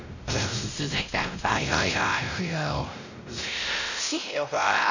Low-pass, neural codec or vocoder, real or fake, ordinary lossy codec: 7.2 kHz; codec, 16 kHz, 0.5 kbps, X-Codec, WavLM features, trained on Multilingual LibriSpeech; fake; none